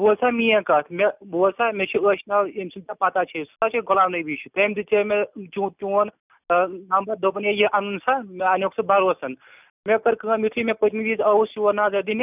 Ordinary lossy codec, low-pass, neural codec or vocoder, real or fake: none; 3.6 kHz; none; real